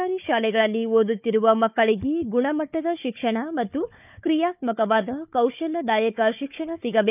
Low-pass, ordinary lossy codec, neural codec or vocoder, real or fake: 3.6 kHz; none; codec, 16 kHz, 4 kbps, FunCodec, trained on Chinese and English, 50 frames a second; fake